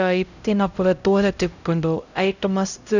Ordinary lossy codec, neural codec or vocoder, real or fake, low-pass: none; codec, 16 kHz, 0.5 kbps, X-Codec, HuBERT features, trained on LibriSpeech; fake; 7.2 kHz